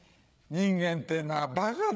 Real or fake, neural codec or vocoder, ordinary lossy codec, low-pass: fake; codec, 16 kHz, 8 kbps, FreqCodec, larger model; none; none